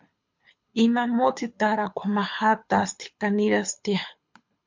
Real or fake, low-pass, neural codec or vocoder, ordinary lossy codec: fake; 7.2 kHz; codec, 24 kHz, 6 kbps, HILCodec; MP3, 48 kbps